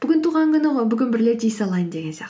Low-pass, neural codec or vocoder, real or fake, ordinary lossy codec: none; none; real; none